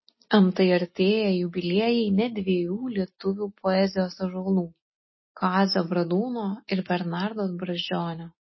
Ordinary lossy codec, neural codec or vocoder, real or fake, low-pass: MP3, 24 kbps; none; real; 7.2 kHz